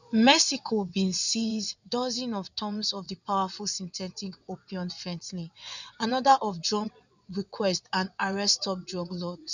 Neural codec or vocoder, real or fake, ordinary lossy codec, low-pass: vocoder, 22.05 kHz, 80 mel bands, WaveNeXt; fake; none; 7.2 kHz